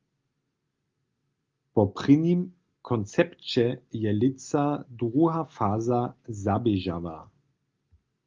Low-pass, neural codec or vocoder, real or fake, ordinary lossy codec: 7.2 kHz; none; real; Opus, 32 kbps